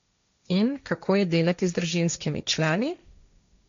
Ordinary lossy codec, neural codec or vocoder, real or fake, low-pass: MP3, 64 kbps; codec, 16 kHz, 1.1 kbps, Voila-Tokenizer; fake; 7.2 kHz